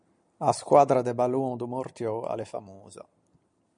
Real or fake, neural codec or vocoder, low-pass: real; none; 9.9 kHz